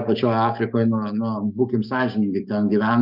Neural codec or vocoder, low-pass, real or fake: codec, 44.1 kHz, 7.8 kbps, DAC; 5.4 kHz; fake